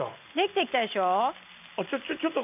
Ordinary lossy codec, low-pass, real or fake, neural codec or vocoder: none; 3.6 kHz; real; none